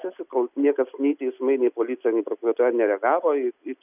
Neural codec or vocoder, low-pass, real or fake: none; 3.6 kHz; real